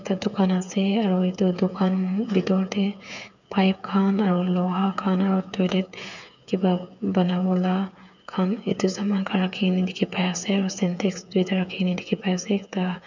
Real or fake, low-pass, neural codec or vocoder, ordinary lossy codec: fake; 7.2 kHz; codec, 16 kHz, 8 kbps, FreqCodec, smaller model; none